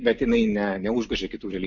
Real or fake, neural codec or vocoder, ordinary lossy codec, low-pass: real; none; MP3, 48 kbps; 7.2 kHz